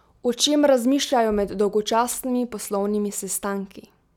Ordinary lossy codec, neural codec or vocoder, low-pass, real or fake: none; none; 19.8 kHz; real